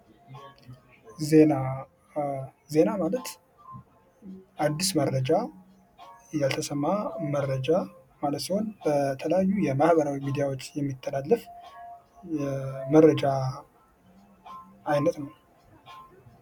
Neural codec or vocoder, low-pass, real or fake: none; 19.8 kHz; real